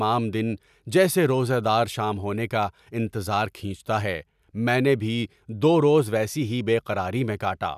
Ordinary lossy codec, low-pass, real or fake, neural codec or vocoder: none; 14.4 kHz; real; none